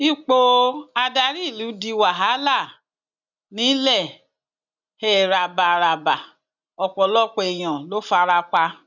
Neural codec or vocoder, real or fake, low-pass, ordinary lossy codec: none; real; 7.2 kHz; none